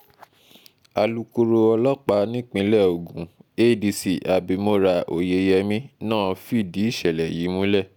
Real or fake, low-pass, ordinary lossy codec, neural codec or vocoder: real; none; none; none